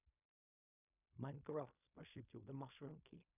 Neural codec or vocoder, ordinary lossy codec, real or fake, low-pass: codec, 16 kHz in and 24 kHz out, 0.4 kbps, LongCat-Audio-Codec, fine tuned four codebook decoder; none; fake; 3.6 kHz